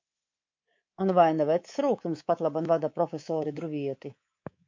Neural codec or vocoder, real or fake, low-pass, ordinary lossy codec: codec, 24 kHz, 3.1 kbps, DualCodec; fake; 7.2 kHz; MP3, 48 kbps